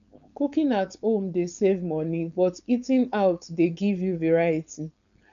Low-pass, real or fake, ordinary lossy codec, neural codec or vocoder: 7.2 kHz; fake; none; codec, 16 kHz, 4.8 kbps, FACodec